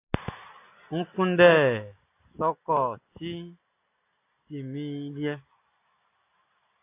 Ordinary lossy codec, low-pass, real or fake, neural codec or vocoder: AAC, 24 kbps; 3.6 kHz; real; none